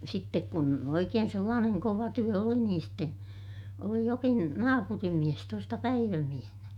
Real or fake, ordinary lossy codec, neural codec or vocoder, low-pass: fake; none; autoencoder, 48 kHz, 128 numbers a frame, DAC-VAE, trained on Japanese speech; 19.8 kHz